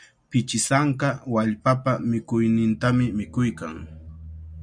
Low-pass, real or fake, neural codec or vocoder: 9.9 kHz; real; none